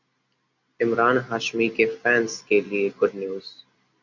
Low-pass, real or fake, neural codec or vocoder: 7.2 kHz; real; none